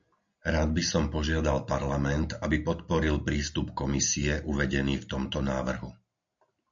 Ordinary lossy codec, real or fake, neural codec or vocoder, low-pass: AAC, 64 kbps; real; none; 7.2 kHz